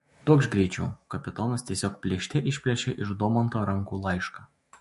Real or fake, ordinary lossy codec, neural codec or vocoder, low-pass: fake; MP3, 48 kbps; autoencoder, 48 kHz, 128 numbers a frame, DAC-VAE, trained on Japanese speech; 14.4 kHz